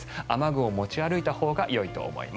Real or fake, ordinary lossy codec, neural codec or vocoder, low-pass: real; none; none; none